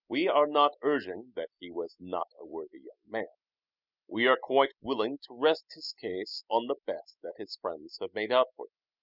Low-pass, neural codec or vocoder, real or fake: 5.4 kHz; none; real